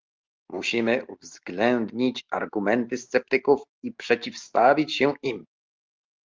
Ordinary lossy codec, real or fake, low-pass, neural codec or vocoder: Opus, 32 kbps; real; 7.2 kHz; none